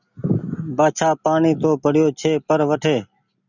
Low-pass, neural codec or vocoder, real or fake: 7.2 kHz; none; real